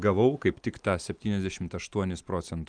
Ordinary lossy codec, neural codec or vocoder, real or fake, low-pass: Opus, 32 kbps; none; real; 9.9 kHz